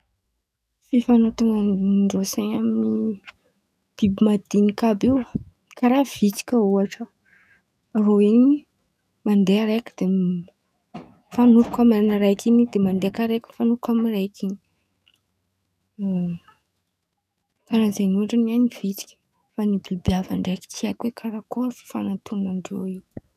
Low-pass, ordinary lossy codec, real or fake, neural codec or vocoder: 14.4 kHz; none; fake; autoencoder, 48 kHz, 128 numbers a frame, DAC-VAE, trained on Japanese speech